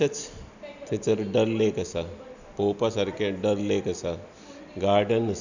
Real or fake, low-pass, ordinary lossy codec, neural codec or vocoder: real; 7.2 kHz; none; none